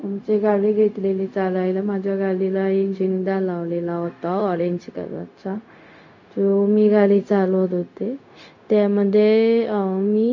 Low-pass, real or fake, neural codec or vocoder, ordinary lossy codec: 7.2 kHz; fake; codec, 16 kHz, 0.4 kbps, LongCat-Audio-Codec; AAC, 48 kbps